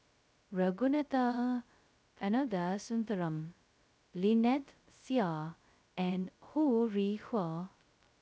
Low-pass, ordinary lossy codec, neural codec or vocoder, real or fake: none; none; codec, 16 kHz, 0.2 kbps, FocalCodec; fake